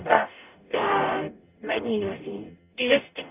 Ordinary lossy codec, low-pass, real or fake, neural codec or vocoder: none; 3.6 kHz; fake; codec, 44.1 kHz, 0.9 kbps, DAC